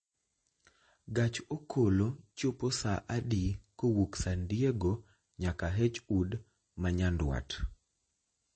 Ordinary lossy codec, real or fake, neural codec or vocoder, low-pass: MP3, 32 kbps; real; none; 9.9 kHz